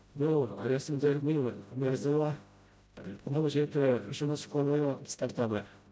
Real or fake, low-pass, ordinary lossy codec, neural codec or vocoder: fake; none; none; codec, 16 kHz, 0.5 kbps, FreqCodec, smaller model